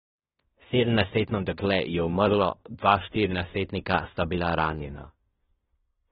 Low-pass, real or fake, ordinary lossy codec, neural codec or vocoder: 10.8 kHz; fake; AAC, 16 kbps; codec, 16 kHz in and 24 kHz out, 0.9 kbps, LongCat-Audio-Codec, fine tuned four codebook decoder